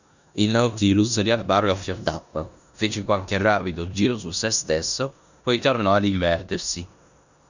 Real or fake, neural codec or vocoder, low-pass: fake; codec, 16 kHz in and 24 kHz out, 0.9 kbps, LongCat-Audio-Codec, four codebook decoder; 7.2 kHz